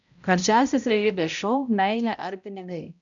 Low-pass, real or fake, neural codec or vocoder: 7.2 kHz; fake; codec, 16 kHz, 0.5 kbps, X-Codec, HuBERT features, trained on balanced general audio